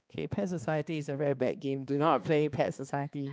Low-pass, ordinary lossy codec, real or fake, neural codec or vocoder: none; none; fake; codec, 16 kHz, 2 kbps, X-Codec, HuBERT features, trained on balanced general audio